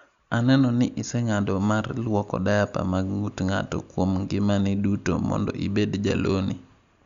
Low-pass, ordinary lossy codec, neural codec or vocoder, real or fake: 7.2 kHz; Opus, 64 kbps; none; real